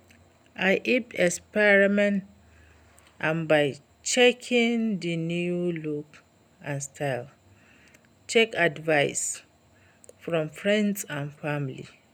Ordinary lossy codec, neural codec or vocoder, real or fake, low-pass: none; none; real; 19.8 kHz